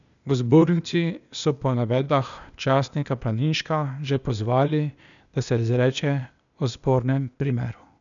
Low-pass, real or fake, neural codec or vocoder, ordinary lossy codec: 7.2 kHz; fake; codec, 16 kHz, 0.8 kbps, ZipCodec; none